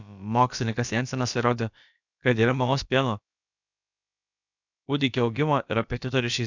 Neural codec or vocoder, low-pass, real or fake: codec, 16 kHz, about 1 kbps, DyCAST, with the encoder's durations; 7.2 kHz; fake